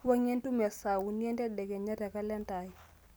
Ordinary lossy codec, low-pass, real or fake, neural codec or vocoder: none; none; real; none